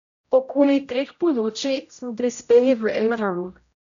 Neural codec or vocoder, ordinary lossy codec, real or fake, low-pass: codec, 16 kHz, 0.5 kbps, X-Codec, HuBERT features, trained on general audio; none; fake; 7.2 kHz